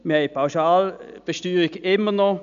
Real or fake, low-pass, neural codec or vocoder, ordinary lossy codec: real; 7.2 kHz; none; none